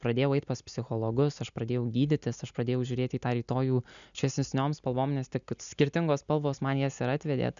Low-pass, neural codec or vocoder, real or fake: 7.2 kHz; none; real